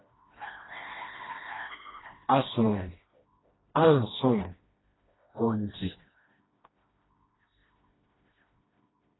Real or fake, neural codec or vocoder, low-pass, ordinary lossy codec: fake; codec, 16 kHz, 1 kbps, FreqCodec, smaller model; 7.2 kHz; AAC, 16 kbps